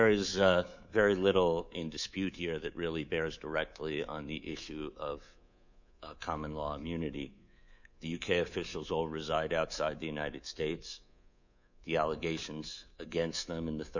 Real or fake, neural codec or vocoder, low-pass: fake; codec, 24 kHz, 3.1 kbps, DualCodec; 7.2 kHz